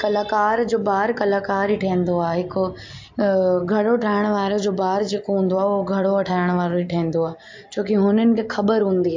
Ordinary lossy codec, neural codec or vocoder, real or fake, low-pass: MP3, 48 kbps; none; real; 7.2 kHz